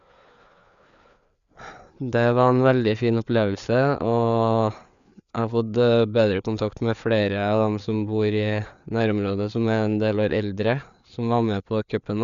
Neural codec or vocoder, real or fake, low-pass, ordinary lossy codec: codec, 16 kHz, 4 kbps, FreqCodec, larger model; fake; 7.2 kHz; none